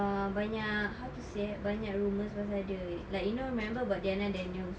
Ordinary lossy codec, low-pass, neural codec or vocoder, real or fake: none; none; none; real